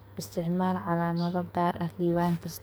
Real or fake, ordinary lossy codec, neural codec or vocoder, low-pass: fake; none; codec, 44.1 kHz, 2.6 kbps, SNAC; none